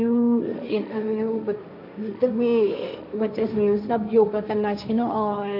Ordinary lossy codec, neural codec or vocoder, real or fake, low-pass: none; codec, 16 kHz, 1.1 kbps, Voila-Tokenizer; fake; 5.4 kHz